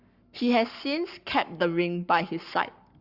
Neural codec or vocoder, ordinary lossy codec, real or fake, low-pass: codec, 44.1 kHz, 7.8 kbps, Pupu-Codec; Opus, 64 kbps; fake; 5.4 kHz